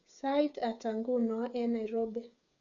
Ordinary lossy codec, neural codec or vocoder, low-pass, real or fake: none; codec, 16 kHz, 8 kbps, FreqCodec, smaller model; 7.2 kHz; fake